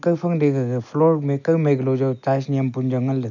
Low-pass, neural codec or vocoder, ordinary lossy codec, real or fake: 7.2 kHz; none; none; real